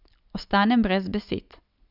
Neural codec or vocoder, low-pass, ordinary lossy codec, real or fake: none; 5.4 kHz; none; real